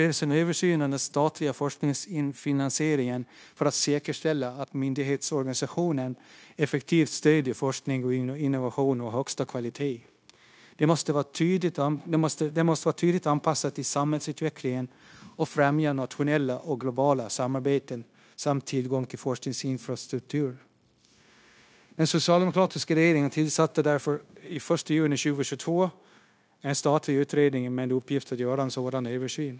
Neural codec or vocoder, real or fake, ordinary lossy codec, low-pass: codec, 16 kHz, 0.9 kbps, LongCat-Audio-Codec; fake; none; none